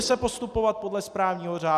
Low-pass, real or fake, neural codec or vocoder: 14.4 kHz; real; none